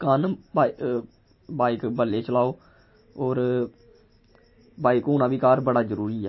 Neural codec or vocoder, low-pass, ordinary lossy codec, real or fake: none; 7.2 kHz; MP3, 24 kbps; real